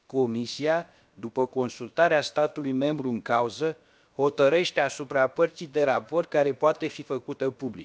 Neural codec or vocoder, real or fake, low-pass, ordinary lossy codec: codec, 16 kHz, about 1 kbps, DyCAST, with the encoder's durations; fake; none; none